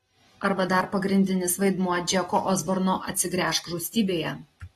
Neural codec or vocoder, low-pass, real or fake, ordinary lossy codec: none; 19.8 kHz; real; AAC, 32 kbps